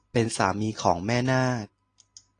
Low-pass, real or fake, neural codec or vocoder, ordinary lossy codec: 9.9 kHz; real; none; AAC, 48 kbps